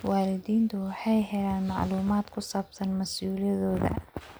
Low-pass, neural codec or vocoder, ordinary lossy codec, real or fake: none; none; none; real